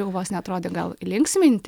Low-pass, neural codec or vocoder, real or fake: 19.8 kHz; none; real